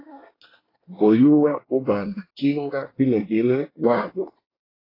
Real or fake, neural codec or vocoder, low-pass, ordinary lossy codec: fake; codec, 24 kHz, 1 kbps, SNAC; 5.4 kHz; AAC, 24 kbps